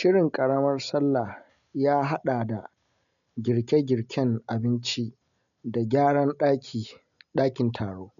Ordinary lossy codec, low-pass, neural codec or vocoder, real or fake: none; 7.2 kHz; none; real